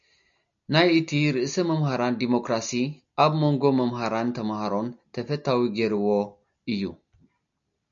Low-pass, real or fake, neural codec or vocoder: 7.2 kHz; real; none